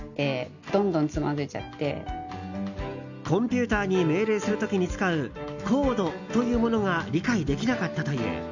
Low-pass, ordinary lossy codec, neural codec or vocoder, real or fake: 7.2 kHz; none; none; real